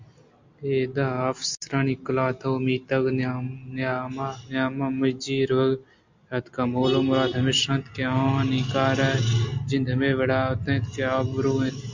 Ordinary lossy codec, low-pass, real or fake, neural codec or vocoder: MP3, 64 kbps; 7.2 kHz; real; none